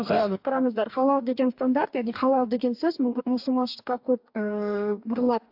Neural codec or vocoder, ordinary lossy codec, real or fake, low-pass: codec, 44.1 kHz, 2.6 kbps, DAC; AAC, 48 kbps; fake; 5.4 kHz